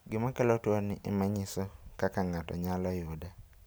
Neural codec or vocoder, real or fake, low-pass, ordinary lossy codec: none; real; none; none